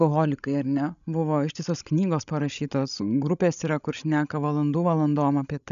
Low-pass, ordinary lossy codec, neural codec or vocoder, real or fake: 7.2 kHz; MP3, 96 kbps; codec, 16 kHz, 16 kbps, FreqCodec, larger model; fake